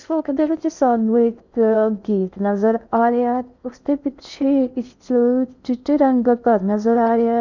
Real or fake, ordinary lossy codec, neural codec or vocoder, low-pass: fake; none; codec, 16 kHz in and 24 kHz out, 0.6 kbps, FocalCodec, streaming, 2048 codes; 7.2 kHz